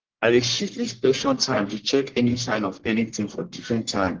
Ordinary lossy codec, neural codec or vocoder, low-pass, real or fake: Opus, 16 kbps; codec, 44.1 kHz, 1.7 kbps, Pupu-Codec; 7.2 kHz; fake